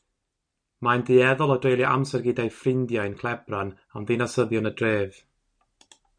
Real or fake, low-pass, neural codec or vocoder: real; 9.9 kHz; none